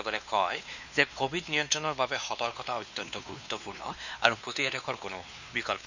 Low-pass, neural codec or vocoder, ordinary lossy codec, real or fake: 7.2 kHz; codec, 16 kHz, 2 kbps, X-Codec, WavLM features, trained on Multilingual LibriSpeech; none; fake